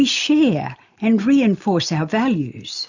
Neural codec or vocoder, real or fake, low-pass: none; real; 7.2 kHz